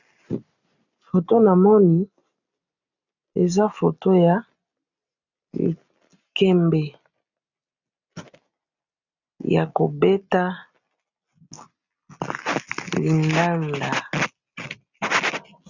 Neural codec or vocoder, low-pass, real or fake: none; 7.2 kHz; real